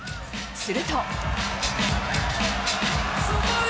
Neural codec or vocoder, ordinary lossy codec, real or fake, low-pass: none; none; real; none